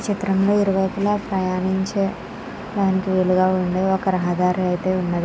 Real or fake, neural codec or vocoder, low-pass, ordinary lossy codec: real; none; none; none